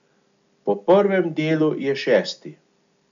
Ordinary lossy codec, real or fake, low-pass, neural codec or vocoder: none; real; 7.2 kHz; none